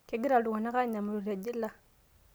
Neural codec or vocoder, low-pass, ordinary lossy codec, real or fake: none; none; none; real